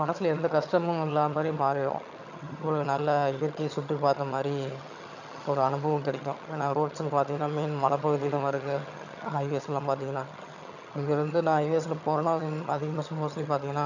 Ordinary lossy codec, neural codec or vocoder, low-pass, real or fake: none; vocoder, 22.05 kHz, 80 mel bands, HiFi-GAN; 7.2 kHz; fake